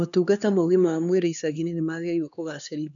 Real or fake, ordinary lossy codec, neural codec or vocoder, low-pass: fake; none; codec, 16 kHz, 2 kbps, X-Codec, HuBERT features, trained on LibriSpeech; 7.2 kHz